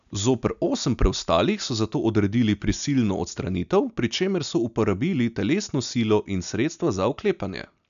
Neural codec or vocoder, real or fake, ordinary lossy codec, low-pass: none; real; none; 7.2 kHz